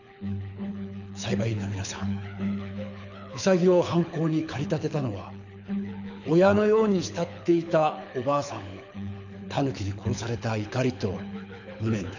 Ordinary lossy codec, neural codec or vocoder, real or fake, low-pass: none; codec, 24 kHz, 6 kbps, HILCodec; fake; 7.2 kHz